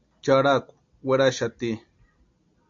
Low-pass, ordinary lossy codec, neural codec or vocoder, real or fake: 7.2 kHz; MP3, 48 kbps; none; real